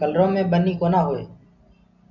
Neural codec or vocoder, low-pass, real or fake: none; 7.2 kHz; real